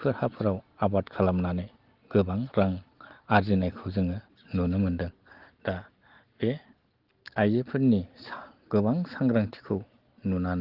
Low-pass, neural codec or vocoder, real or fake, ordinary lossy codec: 5.4 kHz; none; real; Opus, 16 kbps